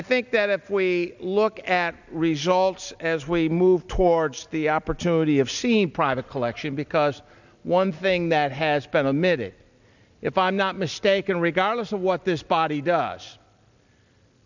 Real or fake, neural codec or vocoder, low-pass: real; none; 7.2 kHz